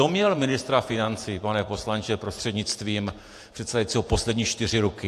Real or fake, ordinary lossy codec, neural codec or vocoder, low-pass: fake; AAC, 64 kbps; vocoder, 48 kHz, 128 mel bands, Vocos; 14.4 kHz